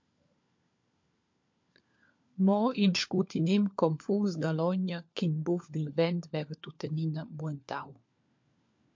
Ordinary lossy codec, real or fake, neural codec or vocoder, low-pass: MP3, 48 kbps; fake; codec, 16 kHz, 4 kbps, FunCodec, trained on LibriTTS, 50 frames a second; 7.2 kHz